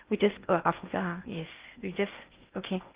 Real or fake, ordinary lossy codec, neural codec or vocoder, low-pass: fake; Opus, 32 kbps; codec, 16 kHz in and 24 kHz out, 0.6 kbps, FocalCodec, streaming, 2048 codes; 3.6 kHz